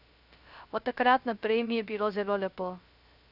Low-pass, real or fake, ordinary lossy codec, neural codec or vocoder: 5.4 kHz; fake; none; codec, 16 kHz, 0.3 kbps, FocalCodec